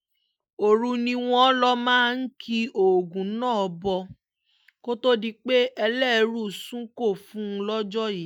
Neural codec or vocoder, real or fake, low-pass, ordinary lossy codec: none; real; 19.8 kHz; none